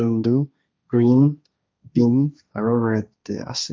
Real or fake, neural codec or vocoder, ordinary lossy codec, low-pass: fake; codec, 16 kHz, 1.1 kbps, Voila-Tokenizer; none; none